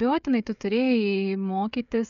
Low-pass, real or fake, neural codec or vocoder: 7.2 kHz; fake; codec, 16 kHz, 16 kbps, FreqCodec, smaller model